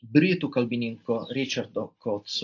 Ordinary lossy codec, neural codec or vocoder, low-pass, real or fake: AAC, 48 kbps; none; 7.2 kHz; real